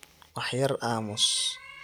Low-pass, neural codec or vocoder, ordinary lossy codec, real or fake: none; none; none; real